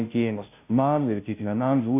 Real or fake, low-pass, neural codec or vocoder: fake; 3.6 kHz; codec, 16 kHz, 0.5 kbps, FunCodec, trained on Chinese and English, 25 frames a second